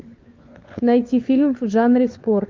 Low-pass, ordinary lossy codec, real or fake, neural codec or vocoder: 7.2 kHz; Opus, 32 kbps; fake; codec, 16 kHz, 4 kbps, FunCodec, trained on LibriTTS, 50 frames a second